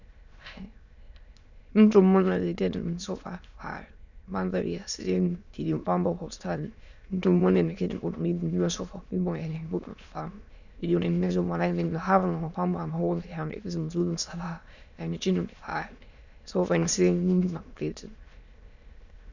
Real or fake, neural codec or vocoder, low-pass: fake; autoencoder, 22.05 kHz, a latent of 192 numbers a frame, VITS, trained on many speakers; 7.2 kHz